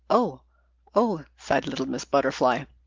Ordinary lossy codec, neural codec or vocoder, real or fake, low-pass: Opus, 32 kbps; none; real; 7.2 kHz